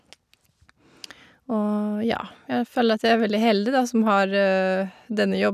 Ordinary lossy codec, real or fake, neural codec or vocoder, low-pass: none; real; none; 14.4 kHz